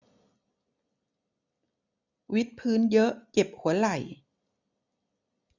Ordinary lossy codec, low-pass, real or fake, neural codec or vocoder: none; 7.2 kHz; real; none